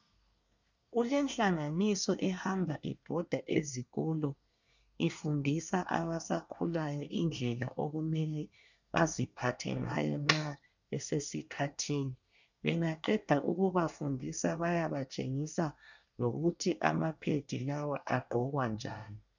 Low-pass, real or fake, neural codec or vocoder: 7.2 kHz; fake; codec, 24 kHz, 1 kbps, SNAC